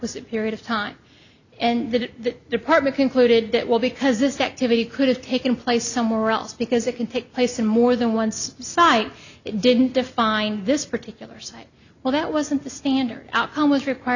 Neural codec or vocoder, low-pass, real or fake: none; 7.2 kHz; real